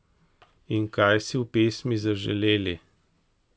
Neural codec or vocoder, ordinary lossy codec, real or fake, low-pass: none; none; real; none